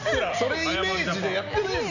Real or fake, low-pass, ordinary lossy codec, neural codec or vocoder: real; 7.2 kHz; none; none